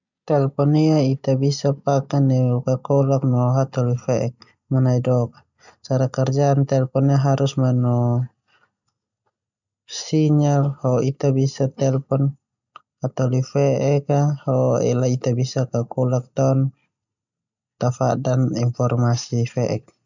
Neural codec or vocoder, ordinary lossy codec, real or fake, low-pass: none; none; real; 7.2 kHz